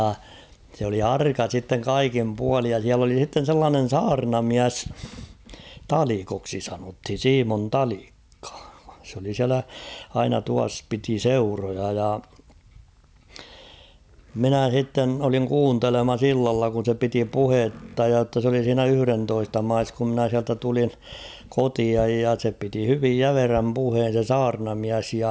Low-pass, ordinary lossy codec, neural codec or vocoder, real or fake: none; none; none; real